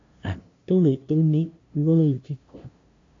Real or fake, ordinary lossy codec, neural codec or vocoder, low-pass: fake; AAC, 48 kbps; codec, 16 kHz, 0.5 kbps, FunCodec, trained on LibriTTS, 25 frames a second; 7.2 kHz